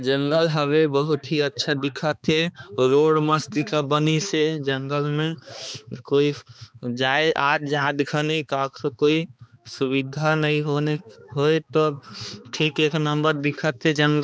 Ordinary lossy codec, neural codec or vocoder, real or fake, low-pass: none; codec, 16 kHz, 2 kbps, X-Codec, HuBERT features, trained on balanced general audio; fake; none